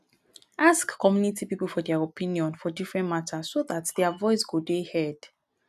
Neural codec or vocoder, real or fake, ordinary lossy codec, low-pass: none; real; none; 14.4 kHz